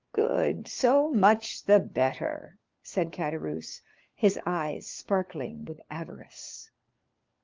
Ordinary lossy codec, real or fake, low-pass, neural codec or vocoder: Opus, 24 kbps; fake; 7.2 kHz; codec, 16 kHz, 4 kbps, FunCodec, trained on LibriTTS, 50 frames a second